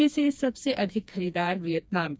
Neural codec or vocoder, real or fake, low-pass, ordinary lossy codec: codec, 16 kHz, 2 kbps, FreqCodec, smaller model; fake; none; none